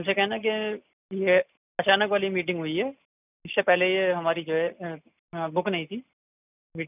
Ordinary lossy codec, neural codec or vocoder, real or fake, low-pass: none; none; real; 3.6 kHz